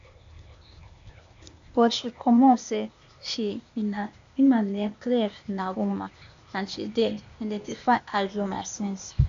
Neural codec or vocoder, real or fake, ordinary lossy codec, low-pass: codec, 16 kHz, 0.8 kbps, ZipCodec; fake; AAC, 64 kbps; 7.2 kHz